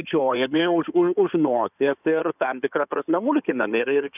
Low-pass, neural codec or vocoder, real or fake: 3.6 kHz; codec, 16 kHz in and 24 kHz out, 2.2 kbps, FireRedTTS-2 codec; fake